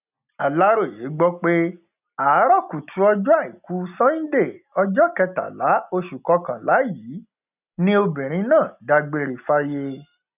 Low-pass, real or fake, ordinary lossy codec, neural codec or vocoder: 3.6 kHz; real; none; none